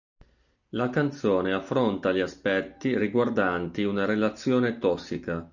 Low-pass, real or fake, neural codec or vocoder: 7.2 kHz; real; none